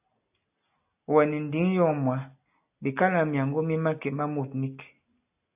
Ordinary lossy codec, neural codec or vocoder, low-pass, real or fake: AAC, 32 kbps; none; 3.6 kHz; real